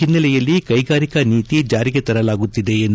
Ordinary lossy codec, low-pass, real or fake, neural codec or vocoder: none; none; real; none